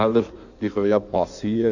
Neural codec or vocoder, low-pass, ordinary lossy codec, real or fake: codec, 16 kHz in and 24 kHz out, 1.1 kbps, FireRedTTS-2 codec; 7.2 kHz; none; fake